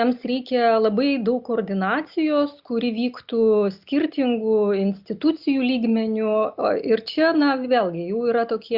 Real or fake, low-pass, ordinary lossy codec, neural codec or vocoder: real; 5.4 kHz; Opus, 64 kbps; none